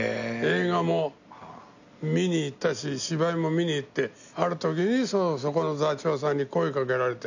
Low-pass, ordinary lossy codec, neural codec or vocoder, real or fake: 7.2 kHz; none; none; real